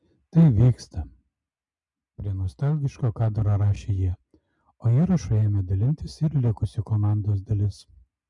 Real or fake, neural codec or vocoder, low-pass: real; none; 10.8 kHz